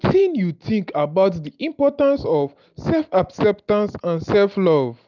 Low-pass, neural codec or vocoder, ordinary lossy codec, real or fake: 7.2 kHz; none; none; real